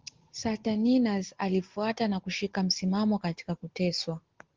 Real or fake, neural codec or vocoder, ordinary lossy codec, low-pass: real; none; Opus, 16 kbps; 7.2 kHz